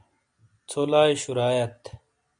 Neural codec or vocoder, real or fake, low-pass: none; real; 9.9 kHz